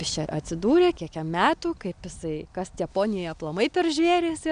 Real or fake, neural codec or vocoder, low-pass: real; none; 9.9 kHz